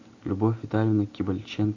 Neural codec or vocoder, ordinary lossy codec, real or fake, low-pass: none; AAC, 48 kbps; real; 7.2 kHz